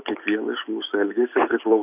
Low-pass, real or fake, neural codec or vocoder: 3.6 kHz; real; none